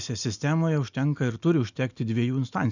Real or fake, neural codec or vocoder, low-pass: real; none; 7.2 kHz